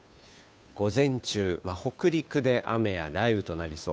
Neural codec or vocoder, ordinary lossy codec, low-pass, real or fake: codec, 16 kHz, 2 kbps, FunCodec, trained on Chinese and English, 25 frames a second; none; none; fake